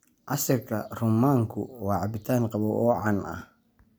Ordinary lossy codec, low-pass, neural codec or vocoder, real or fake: none; none; none; real